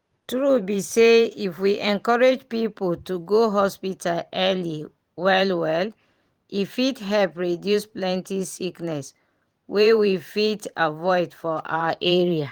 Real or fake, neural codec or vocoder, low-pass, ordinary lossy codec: fake; vocoder, 44.1 kHz, 128 mel bands every 512 samples, BigVGAN v2; 19.8 kHz; Opus, 24 kbps